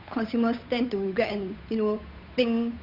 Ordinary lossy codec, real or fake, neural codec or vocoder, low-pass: none; fake; codec, 16 kHz, 8 kbps, FunCodec, trained on Chinese and English, 25 frames a second; 5.4 kHz